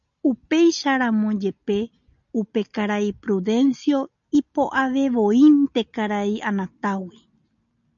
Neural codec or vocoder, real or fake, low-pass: none; real; 7.2 kHz